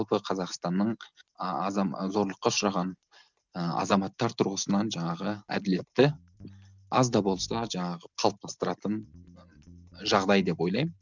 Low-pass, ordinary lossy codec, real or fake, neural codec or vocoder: 7.2 kHz; none; real; none